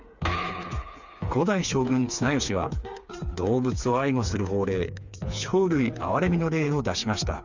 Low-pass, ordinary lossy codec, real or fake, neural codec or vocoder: 7.2 kHz; Opus, 64 kbps; fake; codec, 16 kHz, 4 kbps, FreqCodec, smaller model